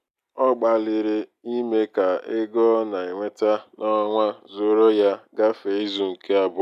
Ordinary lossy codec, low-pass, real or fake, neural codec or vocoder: none; 14.4 kHz; real; none